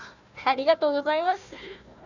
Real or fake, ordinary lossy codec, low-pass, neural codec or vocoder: fake; none; 7.2 kHz; codec, 16 kHz, 1 kbps, FunCodec, trained on Chinese and English, 50 frames a second